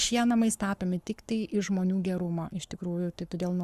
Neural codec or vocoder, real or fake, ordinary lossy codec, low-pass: codec, 44.1 kHz, 7.8 kbps, Pupu-Codec; fake; Opus, 64 kbps; 14.4 kHz